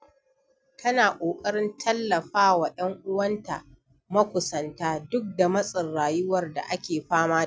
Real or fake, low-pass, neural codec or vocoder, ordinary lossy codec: real; none; none; none